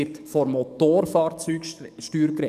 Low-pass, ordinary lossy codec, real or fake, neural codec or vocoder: 14.4 kHz; none; fake; codec, 44.1 kHz, 7.8 kbps, DAC